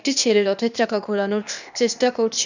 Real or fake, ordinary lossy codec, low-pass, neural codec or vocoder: fake; none; 7.2 kHz; codec, 16 kHz, 0.8 kbps, ZipCodec